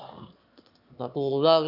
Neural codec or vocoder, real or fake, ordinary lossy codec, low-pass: autoencoder, 22.05 kHz, a latent of 192 numbers a frame, VITS, trained on one speaker; fake; none; 5.4 kHz